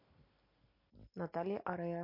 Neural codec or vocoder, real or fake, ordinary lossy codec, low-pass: none; real; MP3, 32 kbps; 5.4 kHz